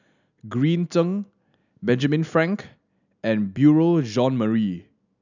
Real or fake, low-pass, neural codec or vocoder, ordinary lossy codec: real; 7.2 kHz; none; none